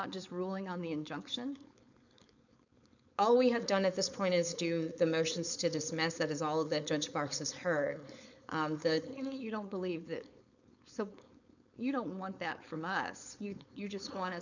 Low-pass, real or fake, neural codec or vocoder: 7.2 kHz; fake; codec, 16 kHz, 4.8 kbps, FACodec